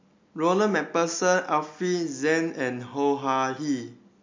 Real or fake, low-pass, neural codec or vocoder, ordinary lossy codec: real; 7.2 kHz; none; MP3, 48 kbps